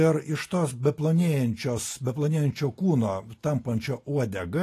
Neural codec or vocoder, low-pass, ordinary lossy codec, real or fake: none; 14.4 kHz; AAC, 48 kbps; real